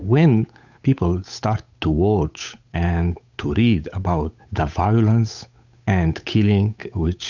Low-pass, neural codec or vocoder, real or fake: 7.2 kHz; codec, 16 kHz, 8 kbps, FunCodec, trained on Chinese and English, 25 frames a second; fake